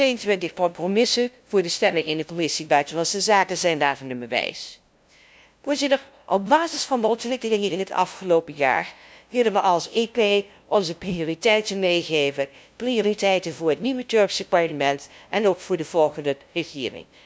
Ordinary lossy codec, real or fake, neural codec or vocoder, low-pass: none; fake; codec, 16 kHz, 0.5 kbps, FunCodec, trained on LibriTTS, 25 frames a second; none